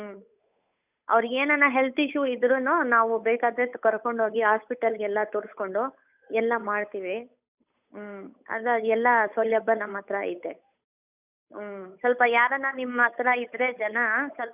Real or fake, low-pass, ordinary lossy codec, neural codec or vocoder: fake; 3.6 kHz; none; codec, 16 kHz, 8 kbps, FunCodec, trained on Chinese and English, 25 frames a second